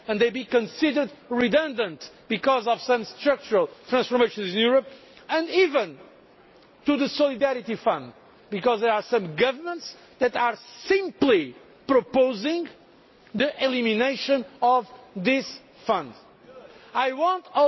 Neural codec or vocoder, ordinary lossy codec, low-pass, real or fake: none; MP3, 24 kbps; 7.2 kHz; real